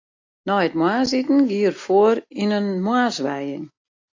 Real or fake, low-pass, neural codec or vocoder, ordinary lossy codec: real; 7.2 kHz; none; AAC, 48 kbps